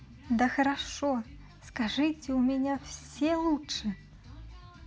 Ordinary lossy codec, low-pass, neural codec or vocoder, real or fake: none; none; none; real